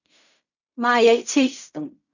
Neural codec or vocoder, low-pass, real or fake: codec, 16 kHz in and 24 kHz out, 0.4 kbps, LongCat-Audio-Codec, fine tuned four codebook decoder; 7.2 kHz; fake